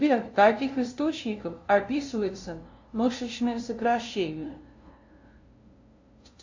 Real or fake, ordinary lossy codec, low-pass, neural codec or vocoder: fake; AAC, 48 kbps; 7.2 kHz; codec, 16 kHz, 0.5 kbps, FunCodec, trained on LibriTTS, 25 frames a second